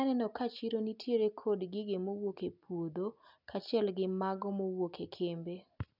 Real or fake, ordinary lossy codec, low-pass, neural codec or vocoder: real; none; 5.4 kHz; none